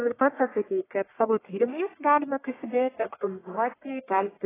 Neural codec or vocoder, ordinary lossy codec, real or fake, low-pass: codec, 44.1 kHz, 1.7 kbps, Pupu-Codec; AAC, 16 kbps; fake; 3.6 kHz